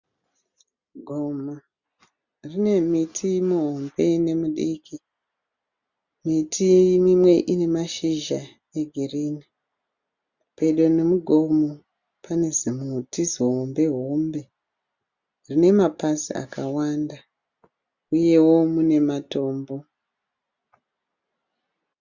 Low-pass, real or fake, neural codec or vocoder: 7.2 kHz; real; none